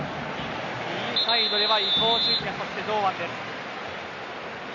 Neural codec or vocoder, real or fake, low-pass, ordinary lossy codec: none; real; 7.2 kHz; none